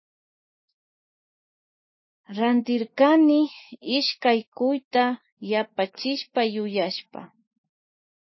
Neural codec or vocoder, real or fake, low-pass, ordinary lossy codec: autoencoder, 48 kHz, 128 numbers a frame, DAC-VAE, trained on Japanese speech; fake; 7.2 kHz; MP3, 24 kbps